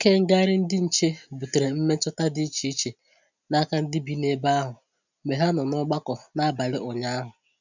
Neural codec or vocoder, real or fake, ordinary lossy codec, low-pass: none; real; none; 7.2 kHz